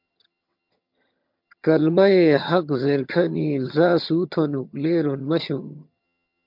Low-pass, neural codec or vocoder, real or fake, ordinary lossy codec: 5.4 kHz; vocoder, 22.05 kHz, 80 mel bands, HiFi-GAN; fake; MP3, 48 kbps